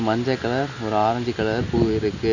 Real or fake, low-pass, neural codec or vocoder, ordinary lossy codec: real; 7.2 kHz; none; none